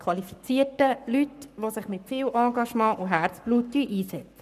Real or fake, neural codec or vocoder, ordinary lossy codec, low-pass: fake; codec, 44.1 kHz, 7.8 kbps, Pupu-Codec; none; 14.4 kHz